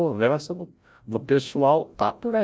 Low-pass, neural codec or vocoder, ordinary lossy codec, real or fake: none; codec, 16 kHz, 0.5 kbps, FreqCodec, larger model; none; fake